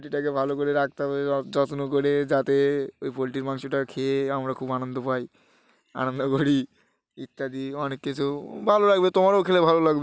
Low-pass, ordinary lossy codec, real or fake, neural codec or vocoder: none; none; real; none